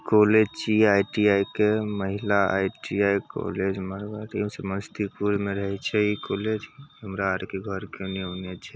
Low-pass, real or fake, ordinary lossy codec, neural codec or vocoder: none; real; none; none